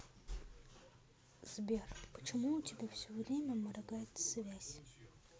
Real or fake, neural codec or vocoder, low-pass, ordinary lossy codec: real; none; none; none